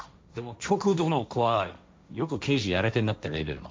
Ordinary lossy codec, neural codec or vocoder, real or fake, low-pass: none; codec, 16 kHz, 1.1 kbps, Voila-Tokenizer; fake; none